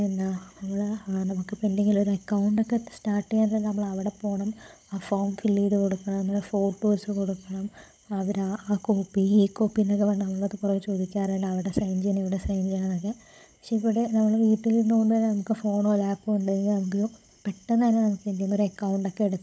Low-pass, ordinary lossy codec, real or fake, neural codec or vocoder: none; none; fake; codec, 16 kHz, 16 kbps, FunCodec, trained on Chinese and English, 50 frames a second